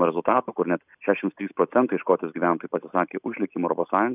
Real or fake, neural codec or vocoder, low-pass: real; none; 3.6 kHz